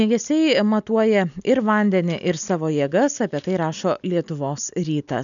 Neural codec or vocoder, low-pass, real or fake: none; 7.2 kHz; real